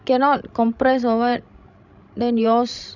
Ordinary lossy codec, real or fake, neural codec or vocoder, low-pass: none; fake; codec, 16 kHz, 16 kbps, FreqCodec, larger model; 7.2 kHz